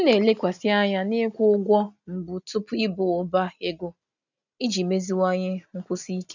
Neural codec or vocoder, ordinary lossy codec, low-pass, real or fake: none; none; 7.2 kHz; real